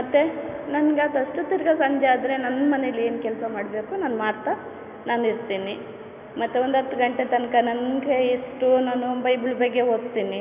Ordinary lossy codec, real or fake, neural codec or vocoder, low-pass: none; real; none; 3.6 kHz